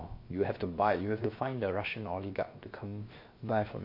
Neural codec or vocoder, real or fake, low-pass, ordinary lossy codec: codec, 16 kHz, about 1 kbps, DyCAST, with the encoder's durations; fake; 5.4 kHz; MP3, 32 kbps